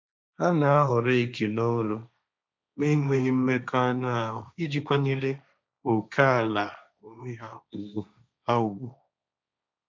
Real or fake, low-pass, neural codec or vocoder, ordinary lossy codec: fake; none; codec, 16 kHz, 1.1 kbps, Voila-Tokenizer; none